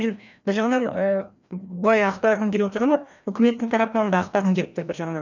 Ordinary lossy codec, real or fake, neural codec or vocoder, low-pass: none; fake; codec, 16 kHz, 1 kbps, FreqCodec, larger model; 7.2 kHz